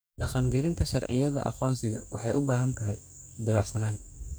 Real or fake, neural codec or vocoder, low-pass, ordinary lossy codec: fake; codec, 44.1 kHz, 2.6 kbps, DAC; none; none